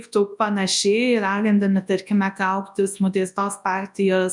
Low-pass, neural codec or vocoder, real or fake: 10.8 kHz; codec, 24 kHz, 0.9 kbps, WavTokenizer, large speech release; fake